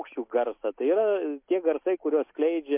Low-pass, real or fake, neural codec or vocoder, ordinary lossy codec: 3.6 kHz; real; none; MP3, 32 kbps